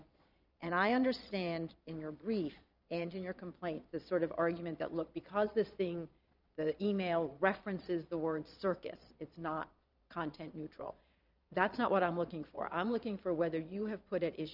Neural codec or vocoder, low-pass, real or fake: vocoder, 22.05 kHz, 80 mel bands, Vocos; 5.4 kHz; fake